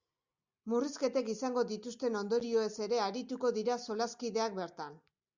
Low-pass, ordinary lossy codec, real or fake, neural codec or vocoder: 7.2 kHz; Opus, 64 kbps; real; none